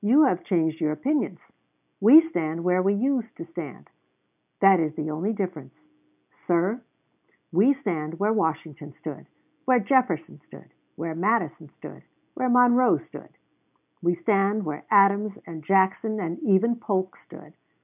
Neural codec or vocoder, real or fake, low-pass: none; real; 3.6 kHz